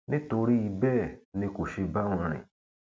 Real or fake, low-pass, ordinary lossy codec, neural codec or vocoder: real; none; none; none